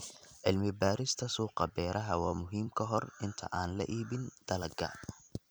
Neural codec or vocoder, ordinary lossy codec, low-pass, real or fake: vocoder, 44.1 kHz, 128 mel bands every 256 samples, BigVGAN v2; none; none; fake